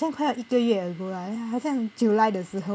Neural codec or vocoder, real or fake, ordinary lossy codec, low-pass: none; real; none; none